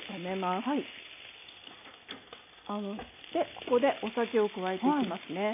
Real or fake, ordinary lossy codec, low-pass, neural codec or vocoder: real; MP3, 24 kbps; 3.6 kHz; none